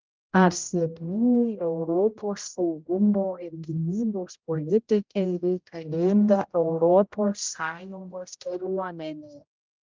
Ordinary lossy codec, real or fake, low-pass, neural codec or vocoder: Opus, 32 kbps; fake; 7.2 kHz; codec, 16 kHz, 0.5 kbps, X-Codec, HuBERT features, trained on general audio